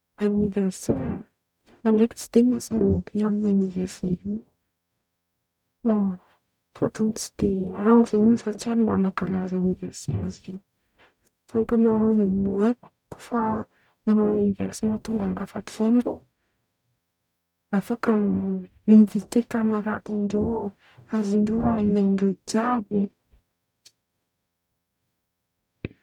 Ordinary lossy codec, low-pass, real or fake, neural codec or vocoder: none; 19.8 kHz; fake; codec, 44.1 kHz, 0.9 kbps, DAC